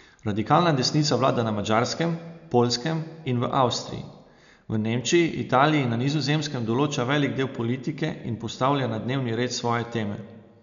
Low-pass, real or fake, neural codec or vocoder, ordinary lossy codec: 7.2 kHz; real; none; none